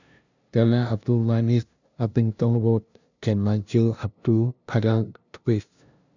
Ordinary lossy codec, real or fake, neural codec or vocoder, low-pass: none; fake; codec, 16 kHz, 0.5 kbps, FunCodec, trained on LibriTTS, 25 frames a second; 7.2 kHz